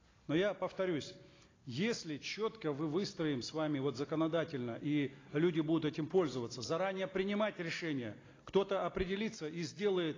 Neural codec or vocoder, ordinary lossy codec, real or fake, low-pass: none; AAC, 32 kbps; real; 7.2 kHz